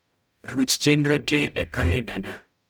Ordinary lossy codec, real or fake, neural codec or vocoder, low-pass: none; fake; codec, 44.1 kHz, 0.9 kbps, DAC; none